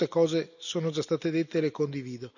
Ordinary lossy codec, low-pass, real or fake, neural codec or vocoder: none; 7.2 kHz; real; none